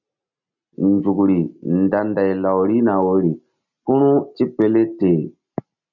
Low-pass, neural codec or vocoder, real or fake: 7.2 kHz; none; real